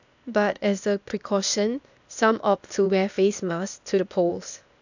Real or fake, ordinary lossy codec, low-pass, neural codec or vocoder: fake; none; 7.2 kHz; codec, 16 kHz, 0.8 kbps, ZipCodec